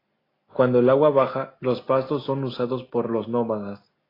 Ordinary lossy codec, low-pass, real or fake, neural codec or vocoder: AAC, 24 kbps; 5.4 kHz; real; none